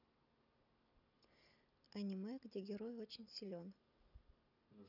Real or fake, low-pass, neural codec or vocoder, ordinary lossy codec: real; 5.4 kHz; none; none